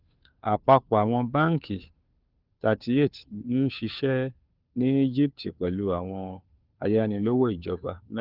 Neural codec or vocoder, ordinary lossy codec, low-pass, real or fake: codec, 16 kHz, 2 kbps, FunCodec, trained on Chinese and English, 25 frames a second; Opus, 24 kbps; 5.4 kHz; fake